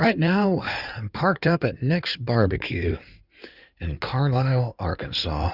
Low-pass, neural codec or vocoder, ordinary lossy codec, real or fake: 5.4 kHz; codec, 16 kHz in and 24 kHz out, 2.2 kbps, FireRedTTS-2 codec; Opus, 64 kbps; fake